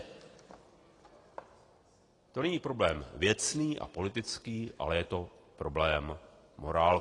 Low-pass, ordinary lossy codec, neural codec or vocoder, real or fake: 10.8 kHz; AAC, 32 kbps; none; real